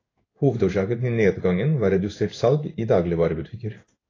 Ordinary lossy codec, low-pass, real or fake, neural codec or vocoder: AAC, 32 kbps; 7.2 kHz; fake; codec, 16 kHz in and 24 kHz out, 1 kbps, XY-Tokenizer